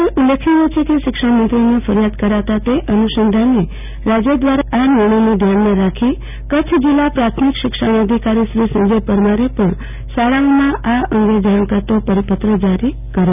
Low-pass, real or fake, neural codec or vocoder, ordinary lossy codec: 3.6 kHz; real; none; none